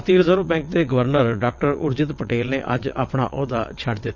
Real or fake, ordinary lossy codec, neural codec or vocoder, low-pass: fake; none; vocoder, 22.05 kHz, 80 mel bands, WaveNeXt; 7.2 kHz